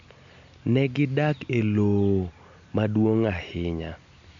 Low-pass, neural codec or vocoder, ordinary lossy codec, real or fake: 7.2 kHz; none; MP3, 64 kbps; real